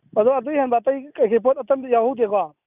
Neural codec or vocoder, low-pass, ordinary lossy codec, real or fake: none; 3.6 kHz; none; real